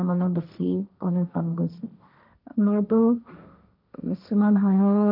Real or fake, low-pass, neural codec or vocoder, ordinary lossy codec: fake; 5.4 kHz; codec, 16 kHz, 1.1 kbps, Voila-Tokenizer; none